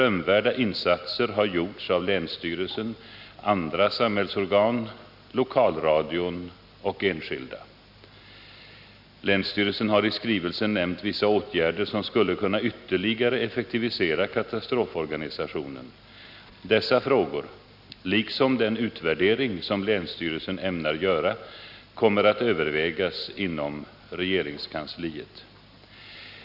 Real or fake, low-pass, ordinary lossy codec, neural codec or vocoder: real; 5.4 kHz; none; none